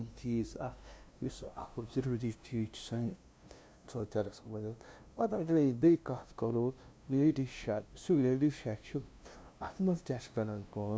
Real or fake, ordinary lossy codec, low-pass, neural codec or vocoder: fake; none; none; codec, 16 kHz, 0.5 kbps, FunCodec, trained on LibriTTS, 25 frames a second